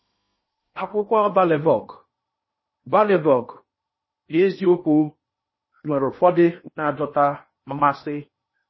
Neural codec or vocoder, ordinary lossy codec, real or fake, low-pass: codec, 16 kHz in and 24 kHz out, 0.8 kbps, FocalCodec, streaming, 65536 codes; MP3, 24 kbps; fake; 7.2 kHz